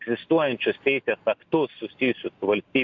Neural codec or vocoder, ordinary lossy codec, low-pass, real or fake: none; AAC, 48 kbps; 7.2 kHz; real